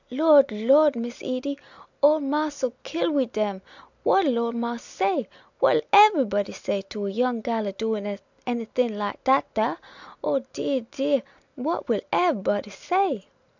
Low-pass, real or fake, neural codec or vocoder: 7.2 kHz; real; none